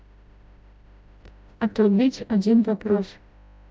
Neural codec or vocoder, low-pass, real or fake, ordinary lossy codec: codec, 16 kHz, 0.5 kbps, FreqCodec, smaller model; none; fake; none